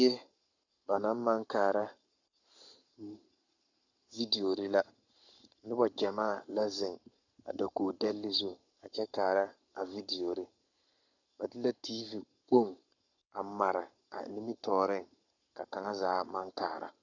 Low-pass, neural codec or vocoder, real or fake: 7.2 kHz; codec, 44.1 kHz, 7.8 kbps, Pupu-Codec; fake